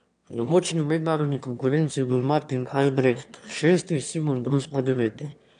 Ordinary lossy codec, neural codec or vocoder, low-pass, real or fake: none; autoencoder, 22.05 kHz, a latent of 192 numbers a frame, VITS, trained on one speaker; 9.9 kHz; fake